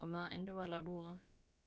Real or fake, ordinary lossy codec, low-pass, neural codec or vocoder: fake; none; none; codec, 16 kHz, about 1 kbps, DyCAST, with the encoder's durations